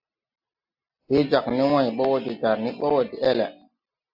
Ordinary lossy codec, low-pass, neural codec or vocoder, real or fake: AAC, 48 kbps; 5.4 kHz; none; real